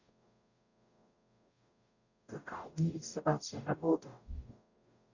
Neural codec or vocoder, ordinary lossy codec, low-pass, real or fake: codec, 44.1 kHz, 0.9 kbps, DAC; none; 7.2 kHz; fake